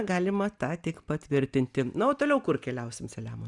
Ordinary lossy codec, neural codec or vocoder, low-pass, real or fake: Opus, 64 kbps; none; 10.8 kHz; real